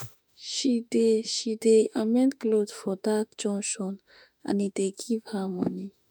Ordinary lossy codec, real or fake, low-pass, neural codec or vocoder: none; fake; none; autoencoder, 48 kHz, 32 numbers a frame, DAC-VAE, trained on Japanese speech